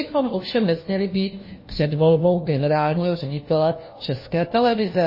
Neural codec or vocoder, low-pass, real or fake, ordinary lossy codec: codec, 16 kHz, 1 kbps, FunCodec, trained on LibriTTS, 50 frames a second; 5.4 kHz; fake; MP3, 24 kbps